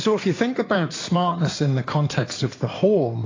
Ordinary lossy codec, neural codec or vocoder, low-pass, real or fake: AAC, 32 kbps; codec, 16 kHz in and 24 kHz out, 2.2 kbps, FireRedTTS-2 codec; 7.2 kHz; fake